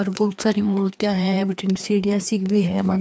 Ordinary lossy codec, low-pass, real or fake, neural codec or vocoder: none; none; fake; codec, 16 kHz, 2 kbps, FreqCodec, larger model